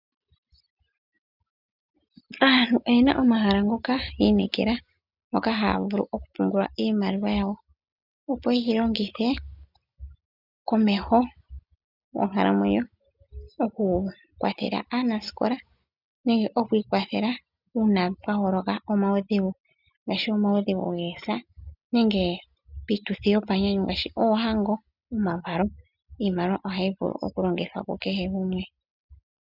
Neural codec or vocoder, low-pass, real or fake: none; 5.4 kHz; real